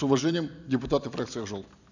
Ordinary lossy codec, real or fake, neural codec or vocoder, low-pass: none; fake; vocoder, 44.1 kHz, 128 mel bands every 256 samples, BigVGAN v2; 7.2 kHz